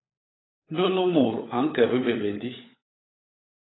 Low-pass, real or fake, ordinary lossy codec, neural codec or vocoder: 7.2 kHz; fake; AAC, 16 kbps; codec, 16 kHz, 16 kbps, FunCodec, trained on LibriTTS, 50 frames a second